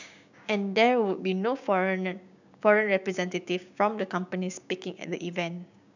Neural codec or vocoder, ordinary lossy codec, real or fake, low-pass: codec, 16 kHz, 6 kbps, DAC; none; fake; 7.2 kHz